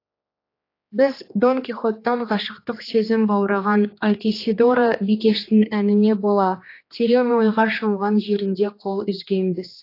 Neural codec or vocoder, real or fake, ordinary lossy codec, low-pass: codec, 16 kHz, 2 kbps, X-Codec, HuBERT features, trained on general audio; fake; AAC, 32 kbps; 5.4 kHz